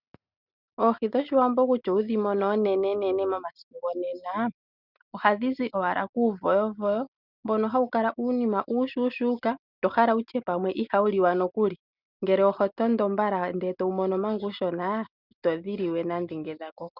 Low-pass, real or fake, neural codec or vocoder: 5.4 kHz; real; none